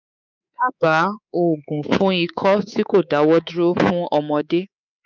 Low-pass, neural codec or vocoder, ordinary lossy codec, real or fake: 7.2 kHz; codec, 24 kHz, 3.1 kbps, DualCodec; none; fake